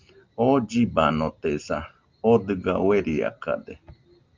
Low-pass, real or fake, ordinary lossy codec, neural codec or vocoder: 7.2 kHz; real; Opus, 32 kbps; none